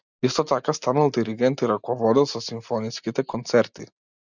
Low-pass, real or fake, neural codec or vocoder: 7.2 kHz; real; none